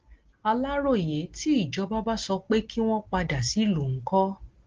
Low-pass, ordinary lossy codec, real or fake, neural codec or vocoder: 7.2 kHz; Opus, 16 kbps; real; none